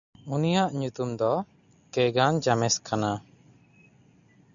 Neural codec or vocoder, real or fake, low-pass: none; real; 7.2 kHz